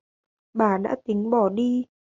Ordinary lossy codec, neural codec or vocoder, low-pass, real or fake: MP3, 64 kbps; none; 7.2 kHz; real